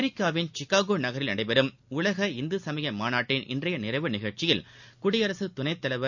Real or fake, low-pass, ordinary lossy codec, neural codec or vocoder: real; 7.2 kHz; none; none